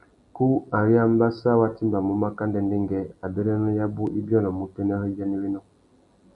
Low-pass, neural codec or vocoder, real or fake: 10.8 kHz; none; real